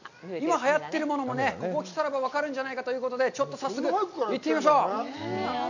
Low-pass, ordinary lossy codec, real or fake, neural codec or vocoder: 7.2 kHz; none; real; none